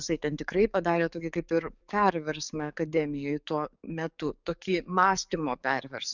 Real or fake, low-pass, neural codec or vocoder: fake; 7.2 kHz; codec, 44.1 kHz, 7.8 kbps, DAC